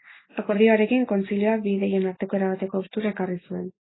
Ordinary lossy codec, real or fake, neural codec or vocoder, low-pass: AAC, 16 kbps; real; none; 7.2 kHz